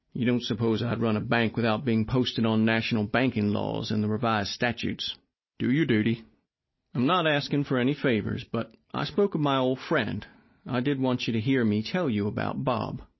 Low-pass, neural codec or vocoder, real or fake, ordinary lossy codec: 7.2 kHz; none; real; MP3, 24 kbps